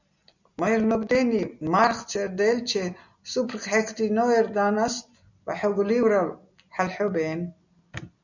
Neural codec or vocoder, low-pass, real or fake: none; 7.2 kHz; real